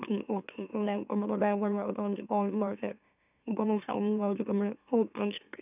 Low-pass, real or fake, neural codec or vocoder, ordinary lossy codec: 3.6 kHz; fake; autoencoder, 44.1 kHz, a latent of 192 numbers a frame, MeloTTS; none